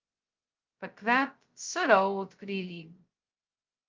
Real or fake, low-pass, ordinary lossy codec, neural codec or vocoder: fake; 7.2 kHz; Opus, 24 kbps; codec, 16 kHz, 0.2 kbps, FocalCodec